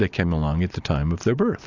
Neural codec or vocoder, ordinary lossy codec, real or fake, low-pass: none; AAC, 48 kbps; real; 7.2 kHz